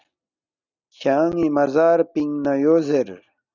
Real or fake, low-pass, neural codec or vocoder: real; 7.2 kHz; none